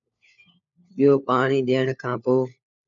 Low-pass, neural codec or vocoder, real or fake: 7.2 kHz; codec, 16 kHz, 4 kbps, FunCodec, trained on LibriTTS, 50 frames a second; fake